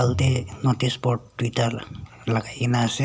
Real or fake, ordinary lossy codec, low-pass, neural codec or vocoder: real; none; none; none